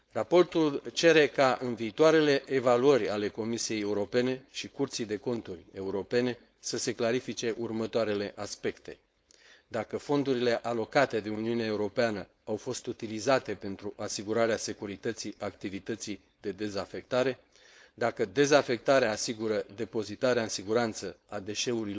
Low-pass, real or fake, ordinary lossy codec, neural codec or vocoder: none; fake; none; codec, 16 kHz, 4.8 kbps, FACodec